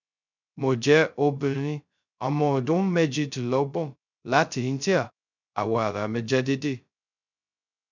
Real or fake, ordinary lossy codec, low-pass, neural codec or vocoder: fake; none; 7.2 kHz; codec, 16 kHz, 0.2 kbps, FocalCodec